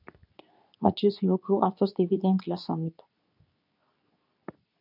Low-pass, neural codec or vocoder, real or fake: 5.4 kHz; codec, 24 kHz, 0.9 kbps, WavTokenizer, medium speech release version 2; fake